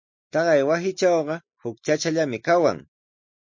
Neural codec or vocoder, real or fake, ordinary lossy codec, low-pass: none; real; MP3, 48 kbps; 7.2 kHz